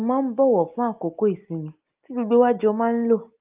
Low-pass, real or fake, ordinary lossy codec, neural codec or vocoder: 3.6 kHz; real; Opus, 32 kbps; none